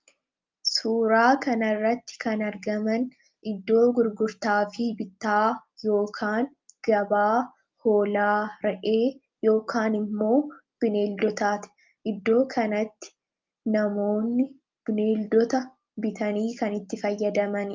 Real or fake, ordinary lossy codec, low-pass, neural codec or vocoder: real; Opus, 24 kbps; 7.2 kHz; none